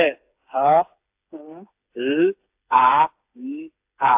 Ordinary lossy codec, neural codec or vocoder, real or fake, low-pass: AAC, 32 kbps; codec, 16 kHz, 4 kbps, FreqCodec, smaller model; fake; 3.6 kHz